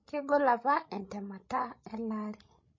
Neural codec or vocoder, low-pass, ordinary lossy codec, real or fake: codec, 16 kHz, 16 kbps, FreqCodec, larger model; 7.2 kHz; MP3, 32 kbps; fake